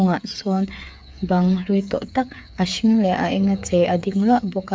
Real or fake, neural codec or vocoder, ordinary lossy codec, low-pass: fake; codec, 16 kHz, 8 kbps, FreqCodec, smaller model; none; none